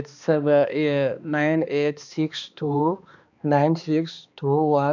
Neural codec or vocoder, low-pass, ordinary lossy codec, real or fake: codec, 16 kHz, 2 kbps, X-Codec, HuBERT features, trained on balanced general audio; 7.2 kHz; none; fake